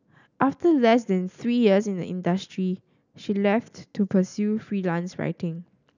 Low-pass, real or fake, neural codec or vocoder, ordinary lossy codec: 7.2 kHz; real; none; none